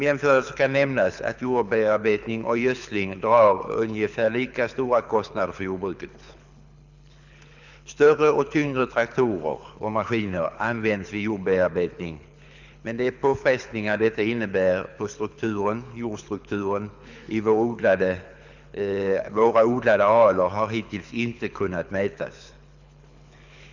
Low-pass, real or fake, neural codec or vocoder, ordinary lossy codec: 7.2 kHz; fake; codec, 24 kHz, 6 kbps, HILCodec; none